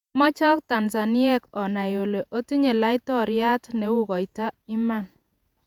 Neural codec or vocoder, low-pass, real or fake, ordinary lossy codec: vocoder, 48 kHz, 128 mel bands, Vocos; 19.8 kHz; fake; none